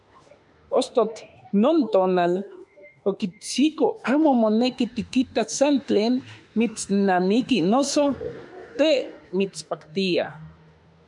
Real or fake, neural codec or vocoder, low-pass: fake; autoencoder, 48 kHz, 32 numbers a frame, DAC-VAE, trained on Japanese speech; 10.8 kHz